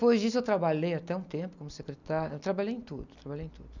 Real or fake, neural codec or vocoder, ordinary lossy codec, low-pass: real; none; none; 7.2 kHz